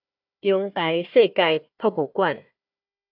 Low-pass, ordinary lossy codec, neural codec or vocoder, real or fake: 5.4 kHz; AAC, 48 kbps; codec, 16 kHz, 1 kbps, FunCodec, trained on Chinese and English, 50 frames a second; fake